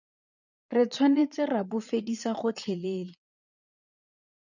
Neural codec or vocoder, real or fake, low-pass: vocoder, 22.05 kHz, 80 mel bands, Vocos; fake; 7.2 kHz